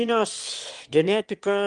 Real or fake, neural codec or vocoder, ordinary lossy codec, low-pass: fake; autoencoder, 22.05 kHz, a latent of 192 numbers a frame, VITS, trained on one speaker; Opus, 24 kbps; 9.9 kHz